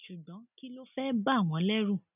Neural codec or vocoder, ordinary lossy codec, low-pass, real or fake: none; none; 3.6 kHz; real